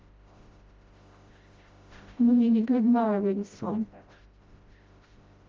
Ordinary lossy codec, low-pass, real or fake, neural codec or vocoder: Opus, 32 kbps; 7.2 kHz; fake; codec, 16 kHz, 0.5 kbps, FreqCodec, smaller model